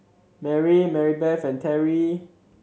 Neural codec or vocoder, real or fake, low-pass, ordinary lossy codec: none; real; none; none